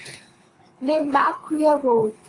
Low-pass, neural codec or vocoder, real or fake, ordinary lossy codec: 10.8 kHz; codec, 24 kHz, 3 kbps, HILCodec; fake; AAC, 32 kbps